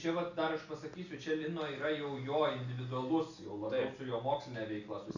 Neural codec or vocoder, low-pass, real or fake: none; 7.2 kHz; real